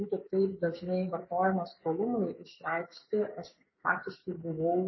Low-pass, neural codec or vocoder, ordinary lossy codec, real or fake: 7.2 kHz; codec, 44.1 kHz, 7.8 kbps, DAC; MP3, 24 kbps; fake